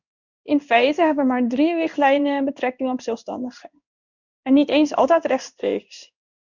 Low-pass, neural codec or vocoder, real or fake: 7.2 kHz; codec, 16 kHz in and 24 kHz out, 1 kbps, XY-Tokenizer; fake